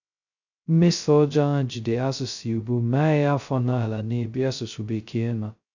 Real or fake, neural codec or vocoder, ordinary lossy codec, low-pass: fake; codec, 16 kHz, 0.2 kbps, FocalCodec; none; 7.2 kHz